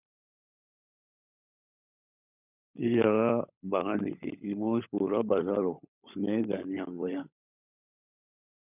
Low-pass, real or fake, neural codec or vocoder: 3.6 kHz; fake; codec, 16 kHz, 16 kbps, FunCodec, trained on LibriTTS, 50 frames a second